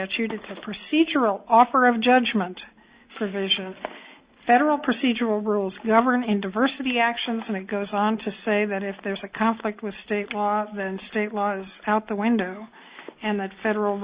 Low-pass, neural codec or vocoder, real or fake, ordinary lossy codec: 3.6 kHz; none; real; Opus, 64 kbps